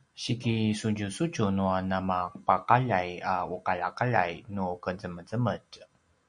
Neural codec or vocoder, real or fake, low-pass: none; real; 9.9 kHz